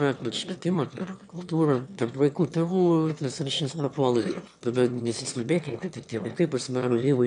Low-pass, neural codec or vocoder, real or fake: 9.9 kHz; autoencoder, 22.05 kHz, a latent of 192 numbers a frame, VITS, trained on one speaker; fake